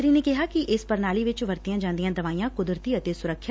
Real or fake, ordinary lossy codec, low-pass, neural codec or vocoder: real; none; none; none